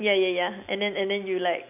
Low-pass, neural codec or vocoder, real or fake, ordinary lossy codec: 3.6 kHz; none; real; none